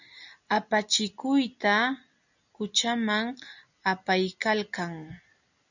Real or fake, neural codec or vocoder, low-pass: real; none; 7.2 kHz